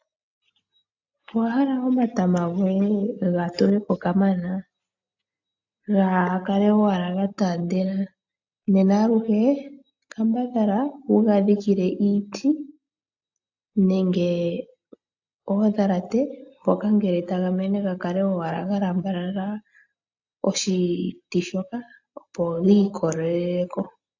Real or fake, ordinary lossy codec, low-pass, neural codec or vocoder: real; AAC, 48 kbps; 7.2 kHz; none